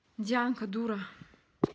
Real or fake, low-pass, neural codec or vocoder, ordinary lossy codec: real; none; none; none